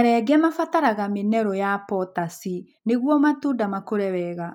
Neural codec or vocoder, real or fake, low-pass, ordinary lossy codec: none; real; 19.8 kHz; none